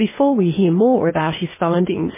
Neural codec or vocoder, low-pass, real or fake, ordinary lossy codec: codec, 16 kHz, 0.8 kbps, ZipCodec; 3.6 kHz; fake; MP3, 16 kbps